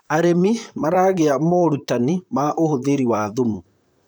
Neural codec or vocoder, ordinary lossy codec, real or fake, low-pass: vocoder, 44.1 kHz, 128 mel bands, Pupu-Vocoder; none; fake; none